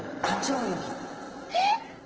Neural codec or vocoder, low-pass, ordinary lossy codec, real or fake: vocoder, 44.1 kHz, 128 mel bands every 512 samples, BigVGAN v2; 7.2 kHz; Opus, 16 kbps; fake